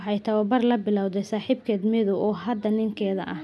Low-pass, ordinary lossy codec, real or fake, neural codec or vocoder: none; none; real; none